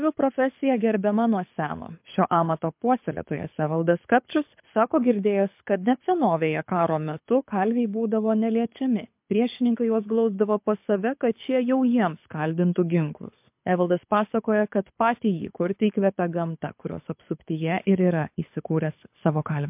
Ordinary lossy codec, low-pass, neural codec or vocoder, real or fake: MP3, 32 kbps; 3.6 kHz; codec, 24 kHz, 6 kbps, HILCodec; fake